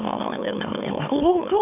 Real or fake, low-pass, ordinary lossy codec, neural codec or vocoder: fake; 3.6 kHz; none; autoencoder, 44.1 kHz, a latent of 192 numbers a frame, MeloTTS